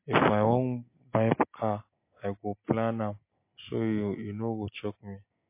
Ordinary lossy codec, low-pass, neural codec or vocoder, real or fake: MP3, 24 kbps; 3.6 kHz; none; real